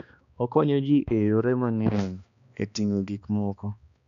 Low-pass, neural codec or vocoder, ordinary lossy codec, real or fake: 7.2 kHz; codec, 16 kHz, 2 kbps, X-Codec, HuBERT features, trained on balanced general audio; none; fake